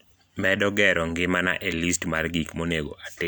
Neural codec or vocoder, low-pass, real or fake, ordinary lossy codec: none; none; real; none